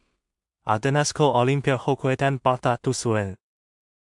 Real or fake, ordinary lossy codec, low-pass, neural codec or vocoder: fake; MP3, 64 kbps; 10.8 kHz; codec, 16 kHz in and 24 kHz out, 0.4 kbps, LongCat-Audio-Codec, two codebook decoder